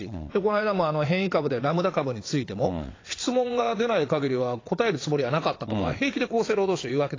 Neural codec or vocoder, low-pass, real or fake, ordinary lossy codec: vocoder, 22.05 kHz, 80 mel bands, WaveNeXt; 7.2 kHz; fake; AAC, 32 kbps